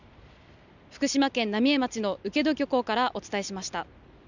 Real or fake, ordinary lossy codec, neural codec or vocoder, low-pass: real; none; none; 7.2 kHz